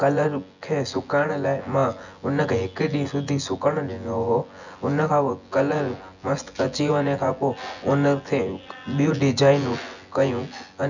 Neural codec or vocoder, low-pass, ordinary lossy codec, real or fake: vocoder, 24 kHz, 100 mel bands, Vocos; 7.2 kHz; none; fake